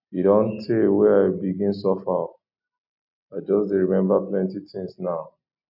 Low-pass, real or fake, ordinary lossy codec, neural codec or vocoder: 5.4 kHz; real; none; none